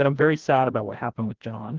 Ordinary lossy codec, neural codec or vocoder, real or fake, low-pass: Opus, 16 kbps; codec, 16 kHz, 1 kbps, FreqCodec, larger model; fake; 7.2 kHz